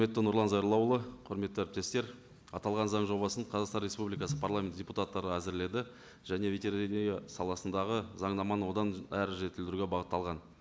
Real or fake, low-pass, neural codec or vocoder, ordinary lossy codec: real; none; none; none